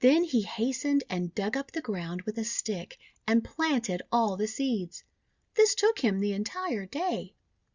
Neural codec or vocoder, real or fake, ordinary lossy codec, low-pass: none; real; Opus, 64 kbps; 7.2 kHz